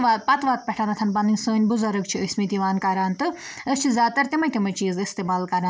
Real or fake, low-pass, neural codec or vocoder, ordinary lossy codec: real; none; none; none